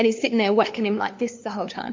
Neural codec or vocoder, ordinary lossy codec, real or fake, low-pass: codec, 16 kHz, 2 kbps, FunCodec, trained on LibriTTS, 25 frames a second; MP3, 48 kbps; fake; 7.2 kHz